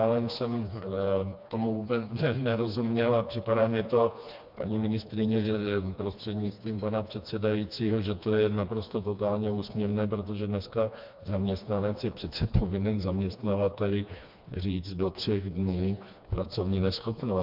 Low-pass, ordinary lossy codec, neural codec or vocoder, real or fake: 5.4 kHz; MP3, 48 kbps; codec, 16 kHz, 2 kbps, FreqCodec, smaller model; fake